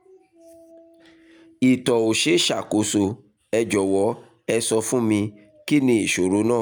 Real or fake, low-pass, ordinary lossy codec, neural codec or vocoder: real; none; none; none